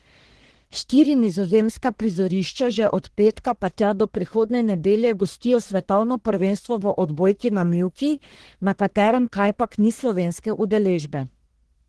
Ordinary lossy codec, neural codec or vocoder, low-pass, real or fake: Opus, 16 kbps; codec, 44.1 kHz, 1.7 kbps, Pupu-Codec; 10.8 kHz; fake